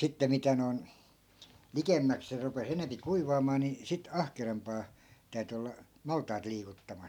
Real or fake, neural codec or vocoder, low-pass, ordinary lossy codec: real; none; 19.8 kHz; none